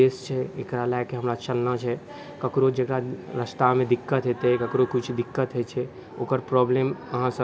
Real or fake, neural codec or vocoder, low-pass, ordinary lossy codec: real; none; none; none